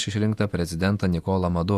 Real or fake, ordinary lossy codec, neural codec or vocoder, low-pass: fake; AAC, 96 kbps; vocoder, 48 kHz, 128 mel bands, Vocos; 14.4 kHz